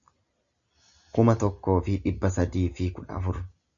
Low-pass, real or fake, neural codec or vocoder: 7.2 kHz; real; none